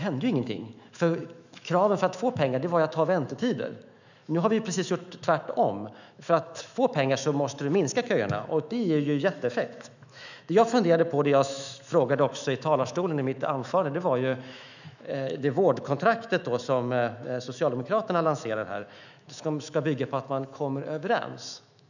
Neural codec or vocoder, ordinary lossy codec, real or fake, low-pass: autoencoder, 48 kHz, 128 numbers a frame, DAC-VAE, trained on Japanese speech; none; fake; 7.2 kHz